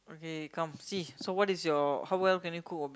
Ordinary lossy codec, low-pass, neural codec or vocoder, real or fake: none; none; none; real